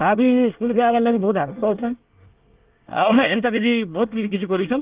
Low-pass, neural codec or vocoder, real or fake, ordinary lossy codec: 3.6 kHz; codec, 24 kHz, 1 kbps, SNAC; fake; Opus, 32 kbps